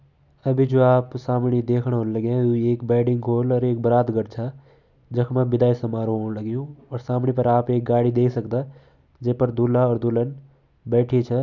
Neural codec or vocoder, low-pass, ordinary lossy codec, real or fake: none; 7.2 kHz; none; real